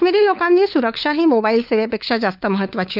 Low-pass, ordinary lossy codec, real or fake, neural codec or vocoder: 5.4 kHz; none; fake; codec, 16 kHz, 4 kbps, FunCodec, trained on LibriTTS, 50 frames a second